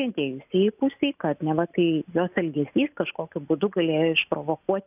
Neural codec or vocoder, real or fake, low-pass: none; real; 3.6 kHz